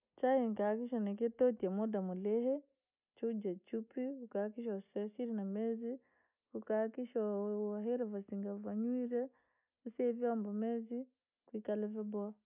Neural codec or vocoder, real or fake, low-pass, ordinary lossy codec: none; real; 3.6 kHz; none